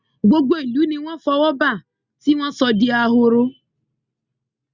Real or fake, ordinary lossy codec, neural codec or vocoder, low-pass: real; Opus, 64 kbps; none; 7.2 kHz